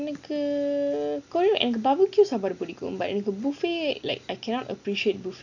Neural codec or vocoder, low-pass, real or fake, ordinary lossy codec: none; 7.2 kHz; real; none